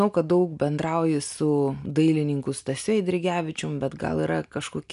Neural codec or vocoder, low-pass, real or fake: none; 10.8 kHz; real